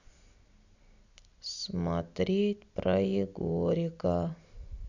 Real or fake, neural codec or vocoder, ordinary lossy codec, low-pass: real; none; none; 7.2 kHz